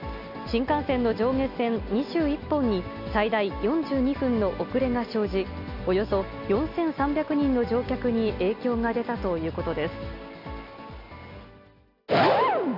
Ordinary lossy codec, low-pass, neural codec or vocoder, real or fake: none; 5.4 kHz; none; real